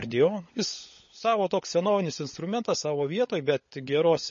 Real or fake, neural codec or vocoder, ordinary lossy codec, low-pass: fake; codec, 16 kHz, 16 kbps, FunCodec, trained on LibriTTS, 50 frames a second; MP3, 32 kbps; 7.2 kHz